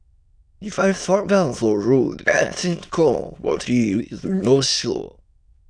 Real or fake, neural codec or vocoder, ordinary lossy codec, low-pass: fake; autoencoder, 22.05 kHz, a latent of 192 numbers a frame, VITS, trained on many speakers; none; 9.9 kHz